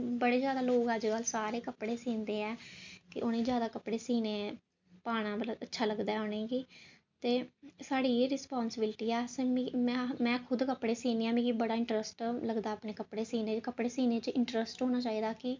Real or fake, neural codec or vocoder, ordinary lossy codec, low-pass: real; none; MP3, 64 kbps; 7.2 kHz